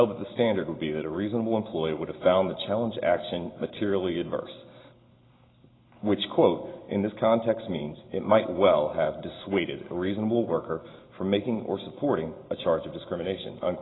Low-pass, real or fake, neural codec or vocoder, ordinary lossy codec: 7.2 kHz; real; none; AAC, 16 kbps